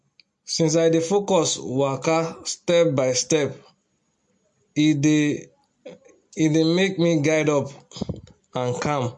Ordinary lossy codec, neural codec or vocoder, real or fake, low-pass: MP3, 48 kbps; none; real; 10.8 kHz